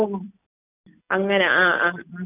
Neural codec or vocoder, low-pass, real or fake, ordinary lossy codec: none; 3.6 kHz; real; none